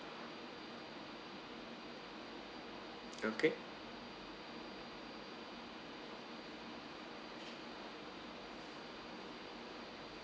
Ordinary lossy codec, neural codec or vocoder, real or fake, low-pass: none; none; real; none